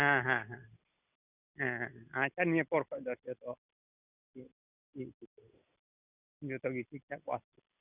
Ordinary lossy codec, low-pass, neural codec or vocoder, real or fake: none; 3.6 kHz; none; real